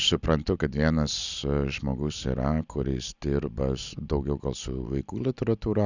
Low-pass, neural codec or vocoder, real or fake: 7.2 kHz; none; real